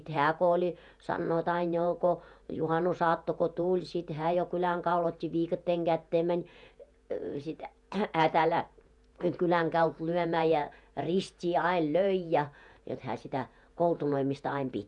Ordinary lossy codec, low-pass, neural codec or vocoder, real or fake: none; 10.8 kHz; vocoder, 48 kHz, 128 mel bands, Vocos; fake